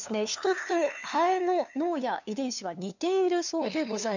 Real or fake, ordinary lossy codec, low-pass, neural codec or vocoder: fake; none; 7.2 kHz; codec, 16 kHz, 2 kbps, FunCodec, trained on LibriTTS, 25 frames a second